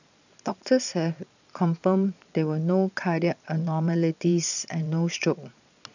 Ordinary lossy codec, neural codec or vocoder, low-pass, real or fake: none; vocoder, 22.05 kHz, 80 mel bands, Vocos; 7.2 kHz; fake